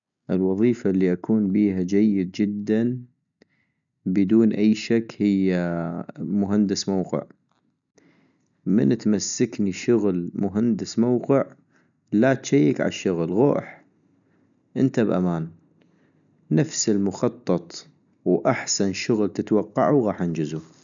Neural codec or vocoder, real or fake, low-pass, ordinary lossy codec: none; real; 7.2 kHz; none